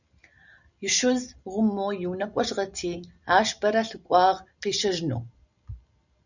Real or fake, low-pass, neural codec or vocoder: real; 7.2 kHz; none